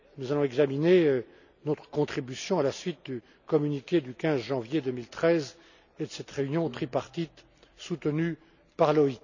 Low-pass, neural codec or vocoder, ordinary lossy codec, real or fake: 7.2 kHz; none; none; real